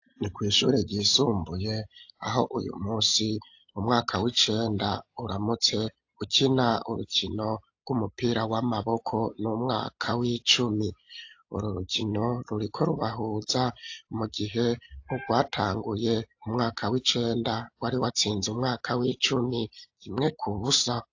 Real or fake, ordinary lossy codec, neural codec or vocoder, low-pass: real; AAC, 48 kbps; none; 7.2 kHz